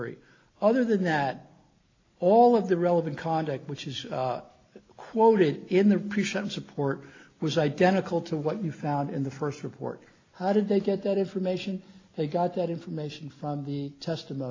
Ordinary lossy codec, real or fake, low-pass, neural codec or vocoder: AAC, 32 kbps; real; 7.2 kHz; none